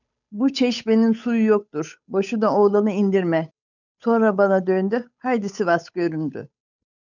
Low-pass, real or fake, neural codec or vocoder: 7.2 kHz; fake; codec, 16 kHz, 8 kbps, FunCodec, trained on Chinese and English, 25 frames a second